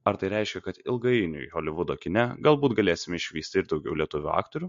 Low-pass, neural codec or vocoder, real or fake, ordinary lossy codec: 7.2 kHz; none; real; MP3, 48 kbps